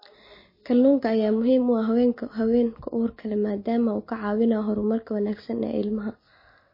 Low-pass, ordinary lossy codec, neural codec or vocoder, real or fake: 5.4 kHz; MP3, 24 kbps; none; real